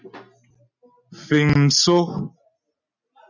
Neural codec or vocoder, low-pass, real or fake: none; 7.2 kHz; real